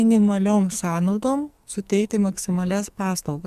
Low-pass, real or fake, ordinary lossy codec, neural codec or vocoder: 14.4 kHz; fake; Opus, 64 kbps; codec, 44.1 kHz, 2.6 kbps, SNAC